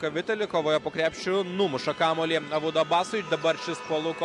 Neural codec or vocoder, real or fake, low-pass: none; real; 10.8 kHz